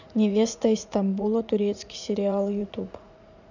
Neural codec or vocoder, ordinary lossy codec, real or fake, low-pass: vocoder, 44.1 kHz, 80 mel bands, Vocos; none; fake; 7.2 kHz